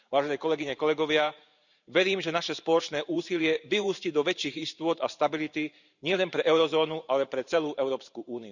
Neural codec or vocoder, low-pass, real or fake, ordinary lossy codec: vocoder, 44.1 kHz, 128 mel bands every 512 samples, BigVGAN v2; 7.2 kHz; fake; none